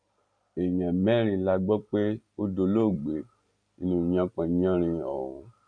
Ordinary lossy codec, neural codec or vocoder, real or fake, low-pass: none; none; real; 9.9 kHz